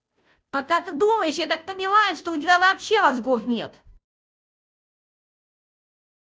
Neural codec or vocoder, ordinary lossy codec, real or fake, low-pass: codec, 16 kHz, 0.5 kbps, FunCodec, trained on Chinese and English, 25 frames a second; none; fake; none